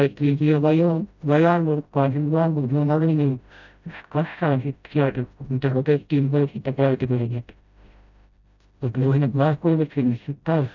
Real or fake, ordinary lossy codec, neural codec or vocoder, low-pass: fake; none; codec, 16 kHz, 0.5 kbps, FreqCodec, smaller model; 7.2 kHz